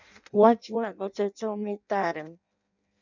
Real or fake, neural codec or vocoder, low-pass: fake; codec, 16 kHz in and 24 kHz out, 0.6 kbps, FireRedTTS-2 codec; 7.2 kHz